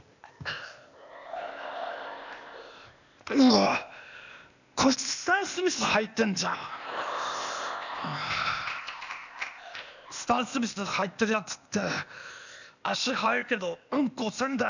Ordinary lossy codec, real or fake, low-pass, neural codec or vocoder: none; fake; 7.2 kHz; codec, 16 kHz, 0.8 kbps, ZipCodec